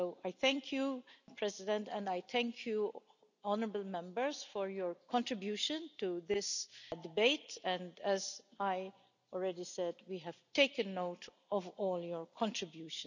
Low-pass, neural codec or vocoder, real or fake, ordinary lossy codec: 7.2 kHz; none; real; none